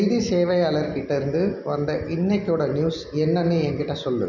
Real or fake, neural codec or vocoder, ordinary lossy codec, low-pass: real; none; none; 7.2 kHz